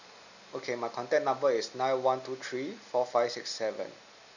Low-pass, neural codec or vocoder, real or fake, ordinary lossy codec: 7.2 kHz; none; real; none